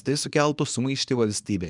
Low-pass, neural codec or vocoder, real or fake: 10.8 kHz; codec, 24 kHz, 0.9 kbps, WavTokenizer, small release; fake